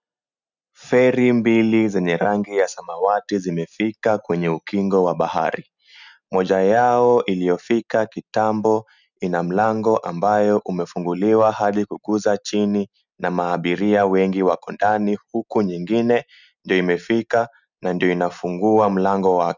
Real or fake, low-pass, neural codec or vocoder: real; 7.2 kHz; none